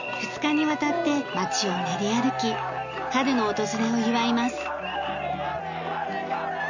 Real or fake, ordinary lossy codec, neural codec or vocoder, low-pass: real; none; none; 7.2 kHz